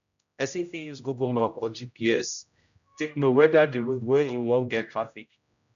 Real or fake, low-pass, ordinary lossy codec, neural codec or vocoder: fake; 7.2 kHz; none; codec, 16 kHz, 0.5 kbps, X-Codec, HuBERT features, trained on general audio